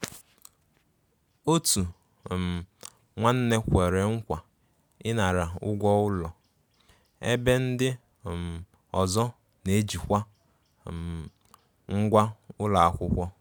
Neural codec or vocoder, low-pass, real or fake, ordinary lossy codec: none; none; real; none